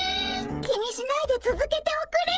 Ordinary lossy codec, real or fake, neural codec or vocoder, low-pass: none; fake; codec, 16 kHz, 16 kbps, FreqCodec, smaller model; none